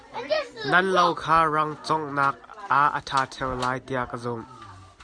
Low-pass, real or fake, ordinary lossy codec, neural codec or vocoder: 9.9 kHz; real; Opus, 64 kbps; none